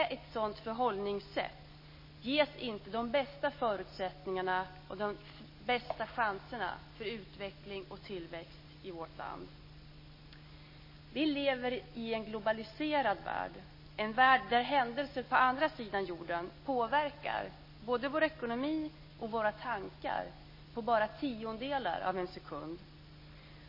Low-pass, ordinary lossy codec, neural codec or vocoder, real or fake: 5.4 kHz; MP3, 24 kbps; none; real